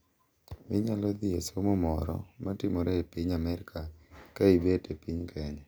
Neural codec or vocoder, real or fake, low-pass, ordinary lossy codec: none; real; none; none